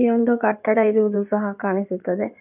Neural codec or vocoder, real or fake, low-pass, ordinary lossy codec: codec, 16 kHz in and 24 kHz out, 2.2 kbps, FireRedTTS-2 codec; fake; 3.6 kHz; none